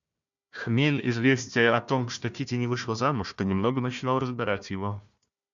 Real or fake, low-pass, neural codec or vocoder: fake; 7.2 kHz; codec, 16 kHz, 1 kbps, FunCodec, trained on Chinese and English, 50 frames a second